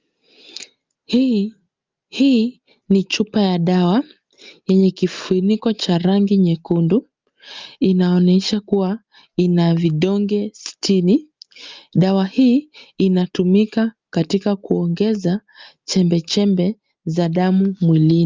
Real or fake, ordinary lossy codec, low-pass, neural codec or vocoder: real; Opus, 24 kbps; 7.2 kHz; none